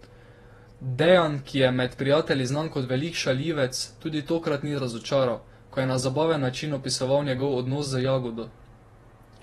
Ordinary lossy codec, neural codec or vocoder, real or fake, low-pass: AAC, 32 kbps; vocoder, 48 kHz, 128 mel bands, Vocos; fake; 19.8 kHz